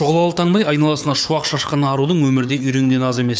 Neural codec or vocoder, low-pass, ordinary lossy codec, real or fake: codec, 16 kHz, 4 kbps, FunCodec, trained on Chinese and English, 50 frames a second; none; none; fake